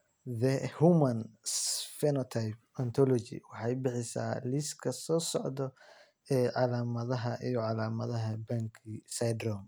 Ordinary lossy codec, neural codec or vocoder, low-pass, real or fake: none; none; none; real